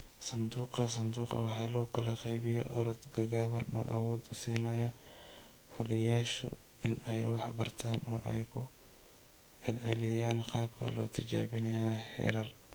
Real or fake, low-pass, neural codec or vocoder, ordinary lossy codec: fake; none; codec, 44.1 kHz, 2.6 kbps, SNAC; none